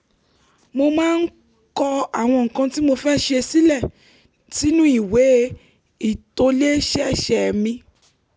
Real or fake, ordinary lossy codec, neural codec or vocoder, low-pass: real; none; none; none